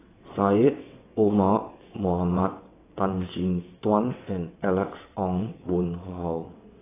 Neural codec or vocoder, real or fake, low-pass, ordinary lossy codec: vocoder, 22.05 kHz, 80 mel bands, WaveNeXt; fake; 3.6 kHz; AAC, 16 kbps